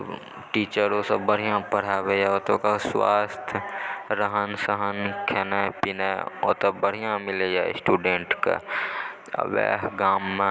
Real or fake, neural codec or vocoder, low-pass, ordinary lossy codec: real; none; none; none